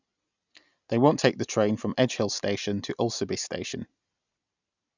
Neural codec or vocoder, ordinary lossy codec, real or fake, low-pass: none; none; real; 7.2 kHz